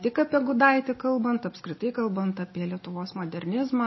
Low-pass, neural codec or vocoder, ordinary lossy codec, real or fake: 7.2 kHz; none; MP3, 24 kbps; real